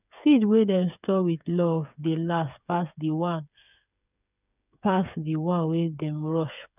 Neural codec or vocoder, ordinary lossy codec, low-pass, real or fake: codec, 16 kHz, 8 kbps, FreqCodec, smaller model; none; 3.6 kHz; fake